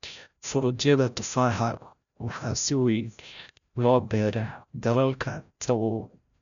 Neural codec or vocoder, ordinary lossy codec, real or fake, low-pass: codec, 16 kHz, 0.5 kbps, FreqCodec, larger model; none; fake; 7.2 kHz